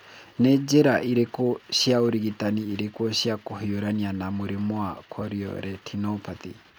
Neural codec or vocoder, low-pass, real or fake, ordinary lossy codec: none; none; real; none